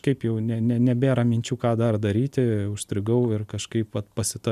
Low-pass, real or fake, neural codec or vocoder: 14.4 kHz; real; none